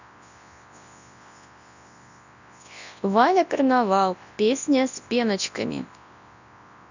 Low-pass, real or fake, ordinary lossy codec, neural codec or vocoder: 7.2 kHz; fake; none; codec, 24 kHz, 0.9 kbps, WavTokenizer, large speech release